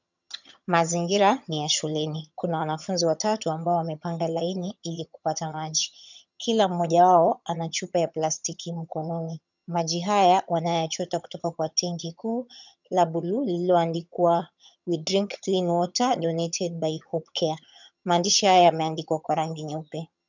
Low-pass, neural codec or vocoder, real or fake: 7.2 kHz; vocoder, 22.05 kHz, 80 mel bands, HiFi-GAN; fake